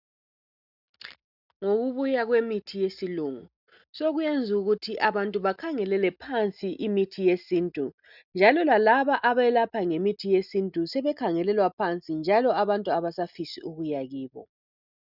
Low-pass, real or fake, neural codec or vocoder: 5.4 kHz; real; none